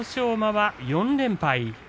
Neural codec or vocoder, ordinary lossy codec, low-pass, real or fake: none; none; none; real